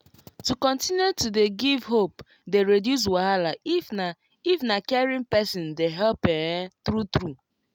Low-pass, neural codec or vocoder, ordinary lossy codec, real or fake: 19.8 kHz; none; none; real